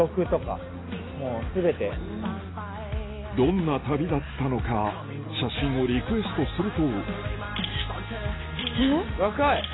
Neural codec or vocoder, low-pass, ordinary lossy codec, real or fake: none; 7.2 kHz; AAC, 16 kbps; real